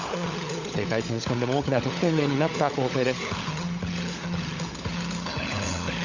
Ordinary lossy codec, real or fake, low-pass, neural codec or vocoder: Opus, 64 kbps; fake; 7.2 kHz; codec, 16 kHz, 16 kbps, FunCodec, trained on LibriTTS, 50 frames a second